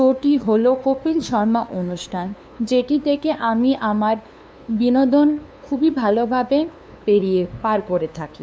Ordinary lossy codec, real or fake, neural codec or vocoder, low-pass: none; fake; codec, 16 kHz, 2 kbps, FunCodec, trained on LibriTTS, 25 frames a second; none